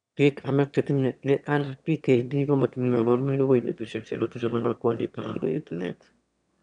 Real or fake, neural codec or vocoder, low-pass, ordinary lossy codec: fake; autoencoder, 22.05 kHz, a latent of 192 numbers a frame, VITS, trained on one speaker; 9.9 kHz; none